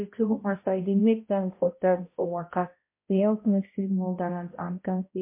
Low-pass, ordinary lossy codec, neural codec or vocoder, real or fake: 3.6 kHz; MP3, 24 kbps; codec, 16 kHz, 0.5 kbps, X-Codec, HuBERT features, trained on balanced general audio; fake